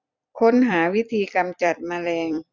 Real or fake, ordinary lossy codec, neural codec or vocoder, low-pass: fake; none; vocoder, 44.1 kHz, 128 mel bands every 256 samples, BigVGAN v2; 7.2 kHz